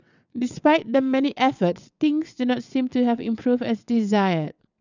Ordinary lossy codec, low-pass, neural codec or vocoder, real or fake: none; 7.2 kHz; vocoder, 22.05 kHz, 80 mel bands, WaveNeXt; fake